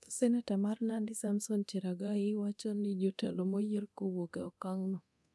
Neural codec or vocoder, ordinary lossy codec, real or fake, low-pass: codec, 24 kHz, 0.9 kbps, DualCodec; none; fake; none